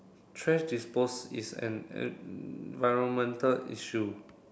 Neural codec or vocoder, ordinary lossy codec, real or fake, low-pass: none; none; real; none